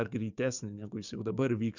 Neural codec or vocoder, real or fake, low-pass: codec, 44.1 kHz, 7.8 kbps, DAC; fake; 7.2 kHz